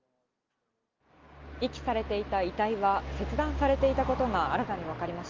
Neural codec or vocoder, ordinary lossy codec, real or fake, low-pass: none; Opus, 32 kbps; real; 7.2 kHz